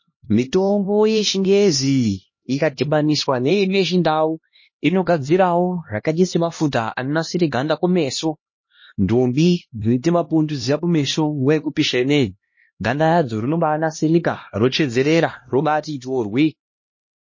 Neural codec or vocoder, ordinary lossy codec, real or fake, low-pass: codec, 16 kHz, 1 kbps, X-Codec, HuBERT features, trained on LibriSpeech; MP3, 32 kbps; fake; 7.2 kHz